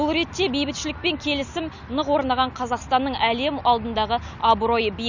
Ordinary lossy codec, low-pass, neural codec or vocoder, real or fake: none; 7.2 kHz; none; real